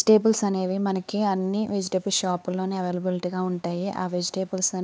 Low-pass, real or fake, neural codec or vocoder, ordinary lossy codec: none; fake; codec, 16 kHz, 4 kbps, X-Codec, HuBERT features, trained on LibriSpeech; none